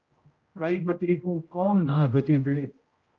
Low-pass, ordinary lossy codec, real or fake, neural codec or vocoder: 7.2 kHz; Opus, 24 kbps; fake; codec, 16 kHz, 0.5 kbps, X-Codec, HuBERT features, trained on general audio